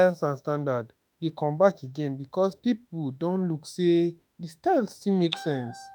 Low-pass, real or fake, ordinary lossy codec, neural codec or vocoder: none; fake; none; autoencoder, 48 kHz, 32 numbers a frame, DAC-VAE, trained on Japanese speech